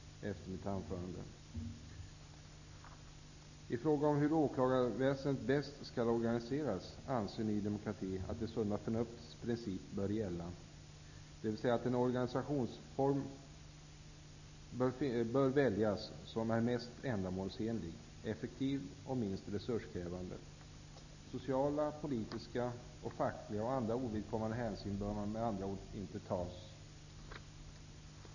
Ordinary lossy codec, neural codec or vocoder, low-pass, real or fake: none; none; 7.2 kHz; real